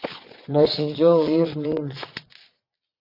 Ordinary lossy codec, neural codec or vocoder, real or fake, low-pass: AAC, 32 kbps; vocoder, 22.05 kHz, 80 mel bands, Vocos; fake; 5.4 kHz